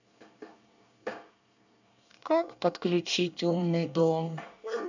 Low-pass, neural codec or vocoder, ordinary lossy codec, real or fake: 7.2 kHz; codec, 24 kHz, 1 kbps, SNAC; none; fake